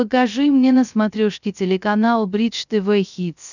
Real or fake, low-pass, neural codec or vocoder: fake; 7.2 kHz; codec, 16 kHz, 0.3 kbps, FocalCodec